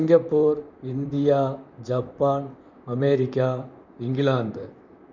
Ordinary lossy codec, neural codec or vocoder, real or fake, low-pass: none; vocoder, 44.1 kHz, 128 mel bands, Pupu-Vocoder; fake; 7.2 kHz